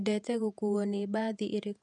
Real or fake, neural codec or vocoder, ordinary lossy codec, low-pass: fake; vocoder, 48 kHz, 128 mel bands, Vocos; none; 10.8 kHz